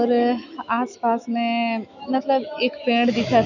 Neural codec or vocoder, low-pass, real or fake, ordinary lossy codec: autoencoder, 48 kHz, 128 numbers a frame, DAC-VAE, trained on Japanese speech; 7.2 kHz; fake; none